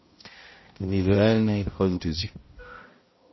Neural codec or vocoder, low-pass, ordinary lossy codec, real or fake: codec, 16 kHz, 0.5 kbps, X-Codec, HuBERT features, trained on balanced general audio; 7.2 kHz; MP3, 24 kbps; fake